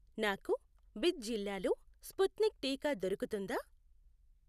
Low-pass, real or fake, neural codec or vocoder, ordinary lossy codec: 14.4 kHz; real; none; none